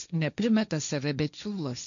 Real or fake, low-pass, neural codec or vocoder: fake; 7.2 kHz; codec, 16 kHz, 1.1 kbps, Voila-Tokenizer